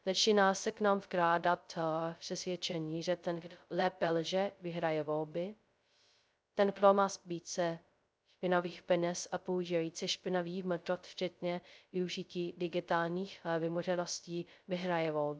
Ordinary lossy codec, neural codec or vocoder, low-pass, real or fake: none; codec, 16 kHz, 0.2 kbps, FocalCodec; none; fake